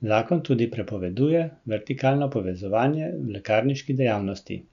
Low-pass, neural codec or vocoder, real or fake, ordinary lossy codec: 7.2 kHz; none; real; none